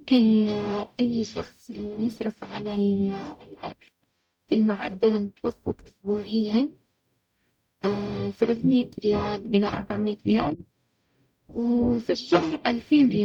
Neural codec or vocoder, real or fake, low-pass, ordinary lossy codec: codec, 44.1 kHz, 0.9 kbps, DAC; fake; 19.8 kHz; none